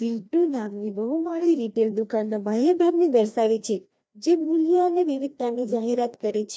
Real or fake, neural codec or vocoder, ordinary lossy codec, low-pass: fake; codec, 16 kHz, 1 kbps, FreqCodec, larger model; none; none